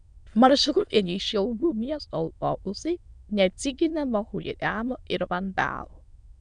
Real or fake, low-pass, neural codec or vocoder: fake; 9.9 kHz; autoencoder, 22.05 kHz, a latent of 192 numbers a frame, VITS, trained on many speakers